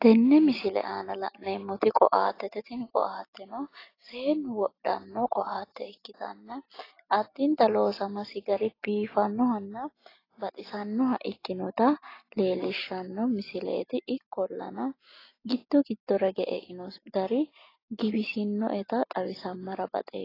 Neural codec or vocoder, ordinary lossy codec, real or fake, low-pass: none; AAC, 24 kbps; real; 5.4 kHz